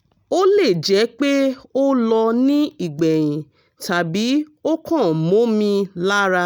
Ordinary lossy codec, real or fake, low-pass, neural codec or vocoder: none; real; none; none